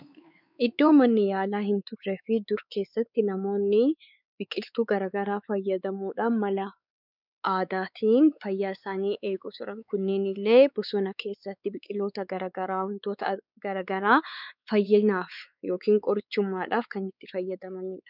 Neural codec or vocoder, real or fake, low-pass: codec, 16 kHz, 4 kbps, X-Codec, WavLM features, trained on Multilingual LibriSpeech; fake; 5.4 kHz